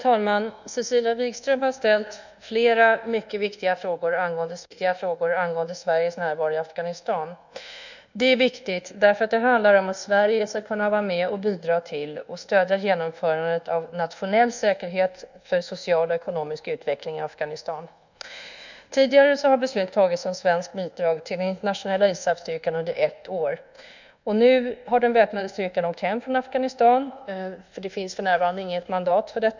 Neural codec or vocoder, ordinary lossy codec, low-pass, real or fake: codec, 24 kHz, 1.2 kbps, DualCodec; none; 7.2 kHz; fake